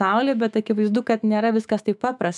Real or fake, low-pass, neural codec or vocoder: fake; 10.8 kHz; autoencoder, 48 kHz, 128 numbers a frame, DAC-VAE, trained on Japanese speech